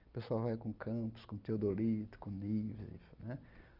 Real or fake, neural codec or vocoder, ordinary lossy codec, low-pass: real; none; Opus, 32 kbps; 5.4 kHz